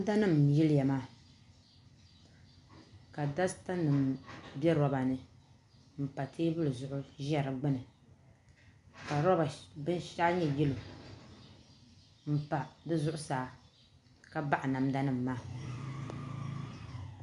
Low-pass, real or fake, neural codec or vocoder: 10.8 kHz; real; none